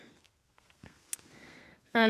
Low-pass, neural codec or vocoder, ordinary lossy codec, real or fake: 14.4 kHz; codec, 32 kHz, 1.9 kbps, SNAC; AAC, 96 kbps; fake